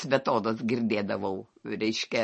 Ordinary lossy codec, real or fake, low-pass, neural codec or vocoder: MP3, 32 kbps; real; 9.9 kHz; none